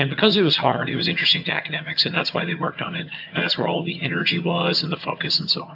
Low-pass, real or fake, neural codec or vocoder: 5.4 kHz; fake; vocoder, 22.05 kHz, 80 mel bands, HiFi-GAN